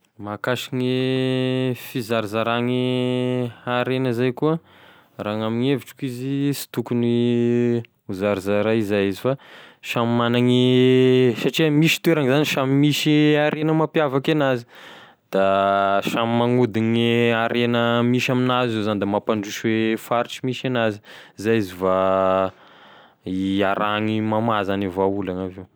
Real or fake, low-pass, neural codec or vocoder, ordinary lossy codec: real; none; none; none